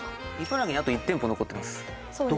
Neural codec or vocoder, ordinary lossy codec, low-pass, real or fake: none; none; none; real